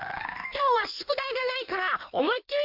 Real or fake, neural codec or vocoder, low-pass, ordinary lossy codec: fake; codec, 16 kHz in and 24 kHz out, 1.1 kbps, FireRedTTS-2 codec; 5.4 kHz; MP3, 48 kbps